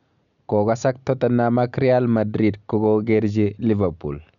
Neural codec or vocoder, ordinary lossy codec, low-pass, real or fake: none; none; 7.2 kHz; real